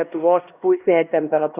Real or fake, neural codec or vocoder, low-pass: fake; codec, 16 kHz, 1 kbps, X-Codec, HuBERT features, trained on LibriSpeech; 3.6 kHz